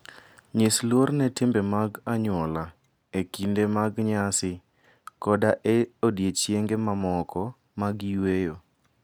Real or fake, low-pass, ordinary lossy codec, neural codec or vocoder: real; none; none; none